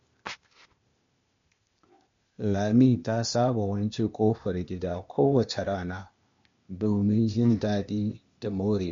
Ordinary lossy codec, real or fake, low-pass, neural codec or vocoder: MP3, 48 kbps; fake; 7.2 kHz; codec, 16 kHz, 0.8 kbps, ZipCodec